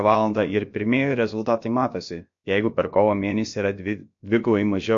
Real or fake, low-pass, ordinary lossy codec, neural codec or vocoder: fake; 7.2 kHz; MP3, 48 kbps; codec, 16 kHz, about 1 kbps, DyCAST, with the encoder's durations